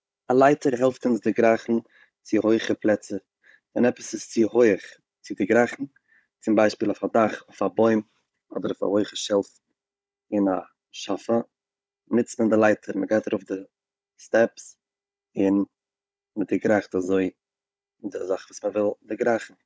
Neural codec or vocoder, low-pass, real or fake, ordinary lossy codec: codec, 16 kHz, 16 kbps, FunCodec, trained on Chinese and English, 50 frames a second; none; fake; none